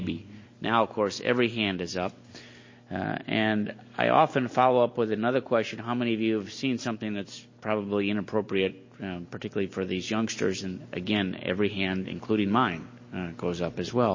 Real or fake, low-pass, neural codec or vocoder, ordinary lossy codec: real; 7.2 kHz; none; MP3, 32 kbps